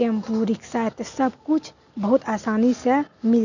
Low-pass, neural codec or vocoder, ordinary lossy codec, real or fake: 7.2 kHz; none; none; real